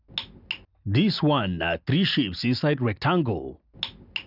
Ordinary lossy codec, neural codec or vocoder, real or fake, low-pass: none; none; real; 5.4 kHz